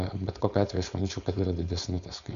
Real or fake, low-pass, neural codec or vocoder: fake; 7.2 kHz; codec, 16 kHz, 4.8 kbps, FACodec